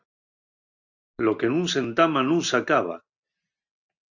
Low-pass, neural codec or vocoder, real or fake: 7.2 kHz; none; real